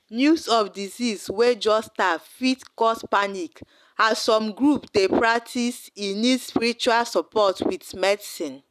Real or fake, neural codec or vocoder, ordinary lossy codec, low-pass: real; none; none; 14.4 kHz